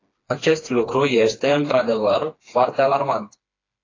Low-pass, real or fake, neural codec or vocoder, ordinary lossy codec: 7.2 kHz; fake; codec, 16 kHz, 2 kbps, FreqCodec, smaller model; AAC, 32 kbps